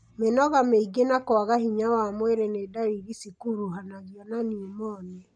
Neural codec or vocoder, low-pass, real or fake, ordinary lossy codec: none; 9.9 kHz; real; none